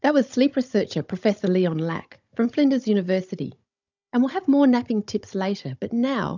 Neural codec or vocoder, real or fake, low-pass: codec, 16 kHz, 16 kbps, FunCodec, trained on Chinese and English, 50 frames a second; fake; 7.2 kHz